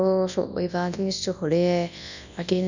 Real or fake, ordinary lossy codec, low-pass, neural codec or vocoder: fake; none; 7.2 kHz; codec, 24 kHz, 0.9 kbps, WavTokenizer, large speech release